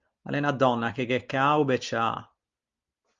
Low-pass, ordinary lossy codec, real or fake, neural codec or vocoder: 7.2 kHz; Opus, 32 kbps; real; none